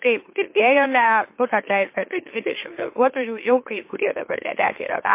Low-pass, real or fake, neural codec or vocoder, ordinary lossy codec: 3.6 kHz; fake; autoencoder, 44.1 kHz, a latent of 192 numbers a frame, MeloTTS; MP3, 24 kbps